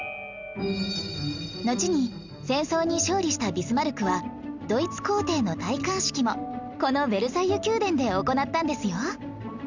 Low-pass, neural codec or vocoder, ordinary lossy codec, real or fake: 7.2 kHz; none; Opus, 64 kbps; real